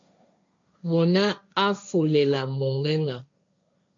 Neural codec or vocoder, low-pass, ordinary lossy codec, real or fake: codec, 16 kHz, 1.1 kbps, Voila-Tokenizer; 7.2 kHz; MP3, 64 kbps; fake